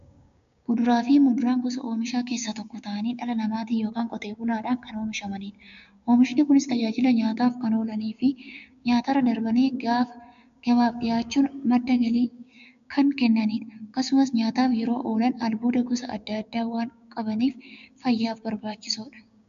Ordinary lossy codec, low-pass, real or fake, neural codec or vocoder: MP3, 64 kbps; 7.2 kHz; fake; codec, 16 kHz, 6 kbps, DAC